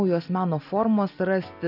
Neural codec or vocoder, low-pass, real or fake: none; 5.4 kHz; real